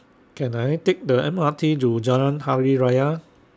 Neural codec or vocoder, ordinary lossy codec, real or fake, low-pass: none; none; real; none